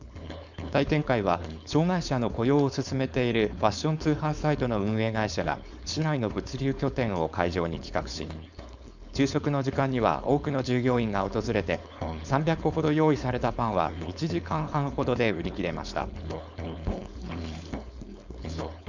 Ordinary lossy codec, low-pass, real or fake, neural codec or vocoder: none; 7.2 kHz; fake; codec, 16 kHz, 4.8 kbps, FACodec